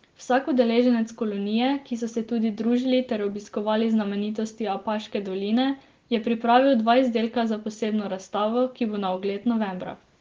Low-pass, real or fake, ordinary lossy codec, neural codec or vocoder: 7.2 kHz; real; Opus, 16 kbps; none